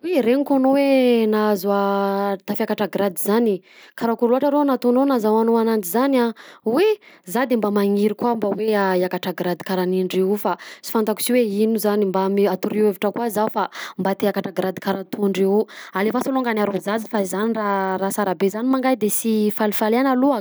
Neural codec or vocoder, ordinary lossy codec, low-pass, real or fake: none; none; none; real